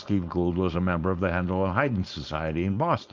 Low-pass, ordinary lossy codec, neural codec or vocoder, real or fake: 7.2 kHz; Opus, 24 kbps; codec, 16 kHz, 4.8 kbps, FACodec; fake